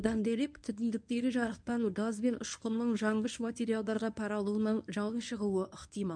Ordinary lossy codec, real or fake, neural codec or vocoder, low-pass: none; fake; codec, 24 kHz, 0.9 kbps, WavTokenizer, medium speech release version 1; 9.9 kHz